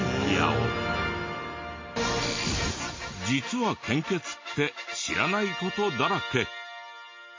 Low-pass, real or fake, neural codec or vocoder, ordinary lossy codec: 7.2 kHz; real; none; MP3, 32 kbps